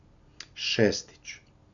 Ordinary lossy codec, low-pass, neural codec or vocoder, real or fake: none; 7.2 kHz; none; real